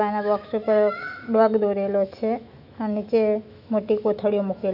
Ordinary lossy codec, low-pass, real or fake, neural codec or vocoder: none; 5.4 kHz; fake; autoencoder, 48 kHz, 128 numbers a frame, DAC-VAE, trained on Japanese speech